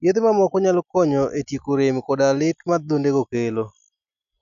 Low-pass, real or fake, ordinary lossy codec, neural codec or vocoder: 7.2 kHz; real; none; none